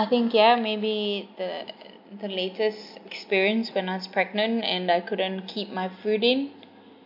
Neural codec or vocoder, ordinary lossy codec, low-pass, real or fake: none; MP3, 48 kbps; 5.4 kHz; real